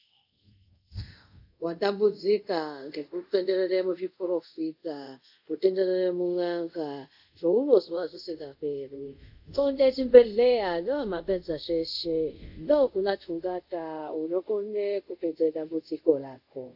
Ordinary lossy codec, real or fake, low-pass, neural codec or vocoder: AAC, 48 kbps; fake; 5.4 kHz; codec, 24 kHz, 0.5 kbps, DualCodec